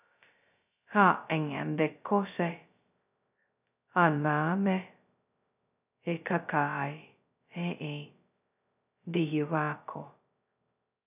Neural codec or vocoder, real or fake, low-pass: codec, 16 kHz, 0.2 kbps, FocalCodec; fake; 3.6 kHz